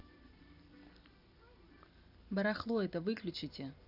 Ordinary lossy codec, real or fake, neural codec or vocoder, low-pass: none; real; none; 5.4 kHz